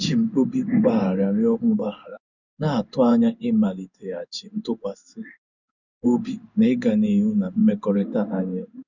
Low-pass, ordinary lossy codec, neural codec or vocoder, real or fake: 7.2 kHz; none; codec, 16 kHz in and 24 kHz out, 1 kbps, XY-Tokenizer; fake